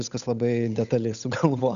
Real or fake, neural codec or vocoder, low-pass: fake; codec, 16 kHz, 8 kbps, FunCodec, trained on Chinese and English, 25 frames a second; 7.2 kHz